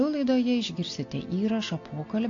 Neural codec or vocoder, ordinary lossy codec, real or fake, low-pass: none; AAC, 48 kbps; real; 7.2 kHz